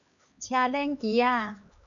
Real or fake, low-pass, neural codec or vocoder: fake; 7.2 kHz; codec, 16 kHz, 4 kbps, X-Codec, HuBERT features, trained on LibriSpeech